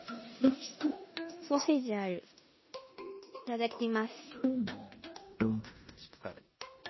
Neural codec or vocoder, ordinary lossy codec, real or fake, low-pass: codec, 16 kHz in and 24 kHz out, 0.9 kbps, LongCat-Audio-Codec, four codebook decoder; MP3, 24 kbps; fake; 7.2 kHz